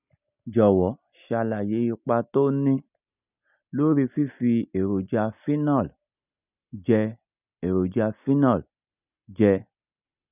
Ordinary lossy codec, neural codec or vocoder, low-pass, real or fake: none; none; 3.6 kHz; real